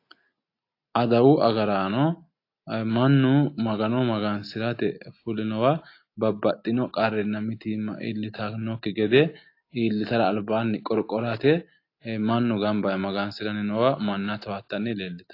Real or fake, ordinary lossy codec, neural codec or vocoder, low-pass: real; AAC, 32 kbps; none; 5.4 kHz